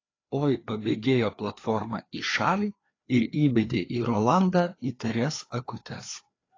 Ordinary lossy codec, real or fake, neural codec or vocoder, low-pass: AAC, 32 kbps; fake; codec, 16 kHz, 2 kbps, FreqCodec, larger model; 7.2 kHz